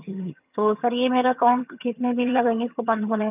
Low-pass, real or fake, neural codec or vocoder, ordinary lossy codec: 3.6 kHz; fake; vocoder, 22.05 kHz, 80 mel bands, HiFi-GAN; none